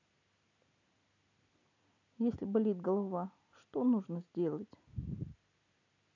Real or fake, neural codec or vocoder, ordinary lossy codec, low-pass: real; none; none; 7.2 kHz